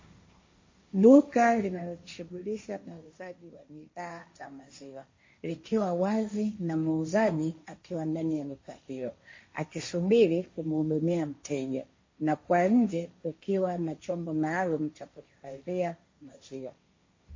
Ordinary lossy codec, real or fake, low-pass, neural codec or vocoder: MP3, 32 kbps; fake; 7.2 kHz; codec, 16 kHz, 1.1 kbps, Voila-Tokenizer